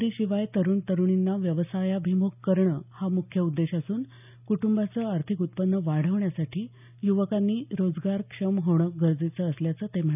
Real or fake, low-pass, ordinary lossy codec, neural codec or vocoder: real; 3.6 kHz; none; none